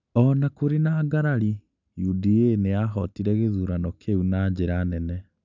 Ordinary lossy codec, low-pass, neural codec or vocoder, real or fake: none; 7.2 kHz; none; real